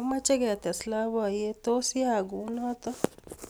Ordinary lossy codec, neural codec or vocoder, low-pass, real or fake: none; none; none; real